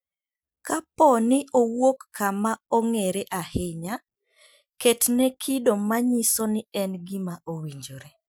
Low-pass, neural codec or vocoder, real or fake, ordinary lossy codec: none; none; real; none